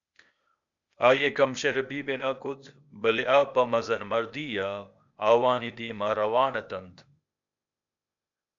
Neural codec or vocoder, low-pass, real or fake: codec, 16 kHz, 0.8 kbps, ZipCodec; 7.2 kHz; fake